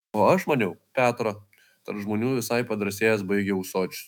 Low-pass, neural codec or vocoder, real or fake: 19.8 kHz; autoencoder, 48 kHz, 128 numbers a frame, DAC-VAE, trained on Japanese speech; fake